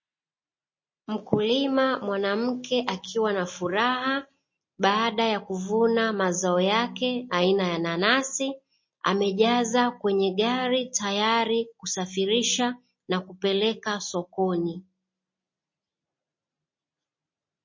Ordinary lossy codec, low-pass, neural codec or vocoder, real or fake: MP3, 32 kbps; 7.2 kHz; none; real